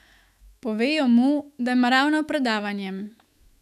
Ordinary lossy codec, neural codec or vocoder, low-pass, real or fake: none; autoencoder, 48 kHz, 128 numbers a frame, DAC-VAE, trained on Japanese speech; 14.4 kHz; fake